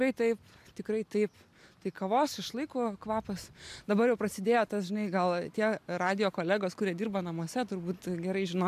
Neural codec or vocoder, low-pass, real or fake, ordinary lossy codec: none; 14.4 kHz; real; MP3, 96 kbps